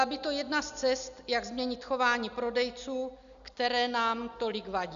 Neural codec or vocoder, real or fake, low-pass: none; real; 7.2 kHz